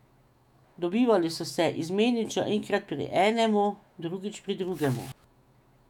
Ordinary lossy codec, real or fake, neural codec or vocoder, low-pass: none; fake; autoencoder, 48 kHz, 128 numbers a frame, DAC-VAE, trained on Japanese speech; 19.8 kHz